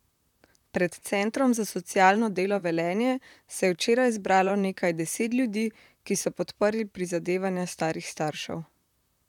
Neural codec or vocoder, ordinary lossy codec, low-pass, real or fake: vocoder, 44.1 kHz, 128 mel bands, Pupu-Vocoder; none; 19.8 kHz; fake